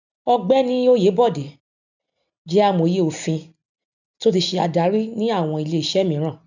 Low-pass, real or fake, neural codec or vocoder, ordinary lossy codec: 7.2 kHz; real; none; none